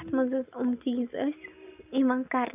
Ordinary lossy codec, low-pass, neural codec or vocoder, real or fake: none; 3.6 kHz; none; real